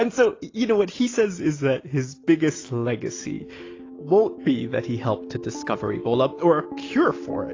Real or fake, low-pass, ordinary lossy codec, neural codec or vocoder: real; 7.2 kHz; AAC, 32 kbps; none